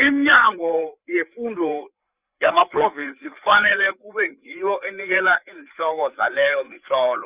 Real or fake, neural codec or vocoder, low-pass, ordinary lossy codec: fake; codec, 16 kHz, 4 kbps, FreqCodec, larger model; 3.6 kHz; Opus, 64 kbps